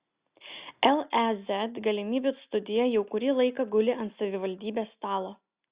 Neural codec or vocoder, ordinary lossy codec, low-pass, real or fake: none; Opus, 64 kbps; 3.6 kHz; real